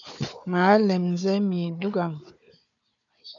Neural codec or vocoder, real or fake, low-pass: codec, 16 kHz, 4 kbps, FunCodec, trained on Chinese and English, 50 frames a second; fake; 7.2 kHz